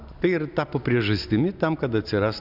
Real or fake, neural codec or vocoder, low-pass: real; none; 5.4 kHz